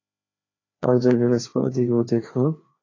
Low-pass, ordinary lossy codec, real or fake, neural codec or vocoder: 7.2 kHz; AAC, 32 kbps; fake; codec, 16 kHz, 2 kbps, FreqCodec, larger model